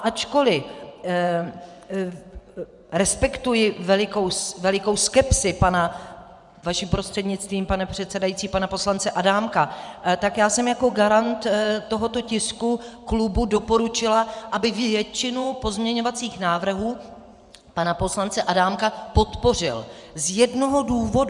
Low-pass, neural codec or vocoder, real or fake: 10.8 kHz; vocoder, 24 kHz, 100 mel bands, Vocos; fake